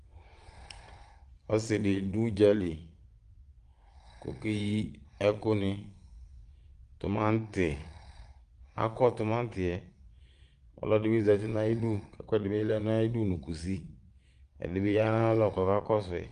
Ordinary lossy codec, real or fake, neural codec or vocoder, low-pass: Opus, 32 kbps; fake; vocoder, 22.05 kHz, 80 mel bands, Vocos; 9.9 kHz